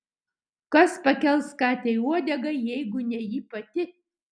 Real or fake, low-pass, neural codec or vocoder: real; 9.9 kHz; none